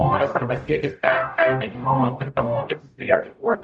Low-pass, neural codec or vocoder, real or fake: 9.9 kHz; codec, 44.1 kHz, 0.9 kbps, DAC; fake